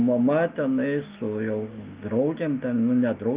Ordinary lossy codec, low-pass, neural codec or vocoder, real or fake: Opus, 24 kbps; 3.6 kHz; none; real